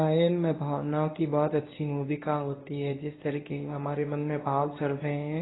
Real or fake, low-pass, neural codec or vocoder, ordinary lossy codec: fake; 7.2 kHz; codec, 24 kHz, 0.9 kbps, WavTokenizer, medium speech release version 1; AAC, 16 kbps